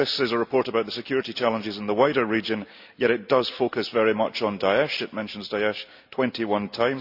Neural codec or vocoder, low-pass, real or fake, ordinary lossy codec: none; 5.4 kHz; real; AAC, 48 kbps